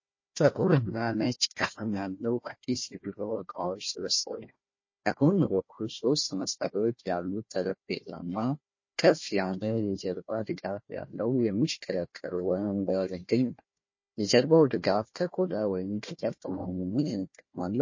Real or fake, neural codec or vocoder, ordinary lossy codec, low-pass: fake; codec, 16 kHz, 1 kbps, FunCodec, trained on Chinese and English, 50 frames a second; MP3, 32 kbps; 7.2 kHz